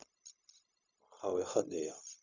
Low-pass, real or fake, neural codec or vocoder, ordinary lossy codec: 7.2 kHz; fake; codec, 16 kHz, 0.4 kbps, LongCat-Audio-Codec; none